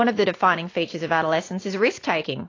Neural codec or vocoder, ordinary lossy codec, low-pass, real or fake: none; AAC, 32 kbps; 7.2 kHz; real